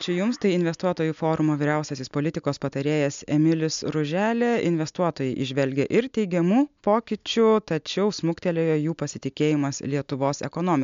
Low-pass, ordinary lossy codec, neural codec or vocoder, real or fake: 7.2 kHz; MP3, 64 kbps; none; real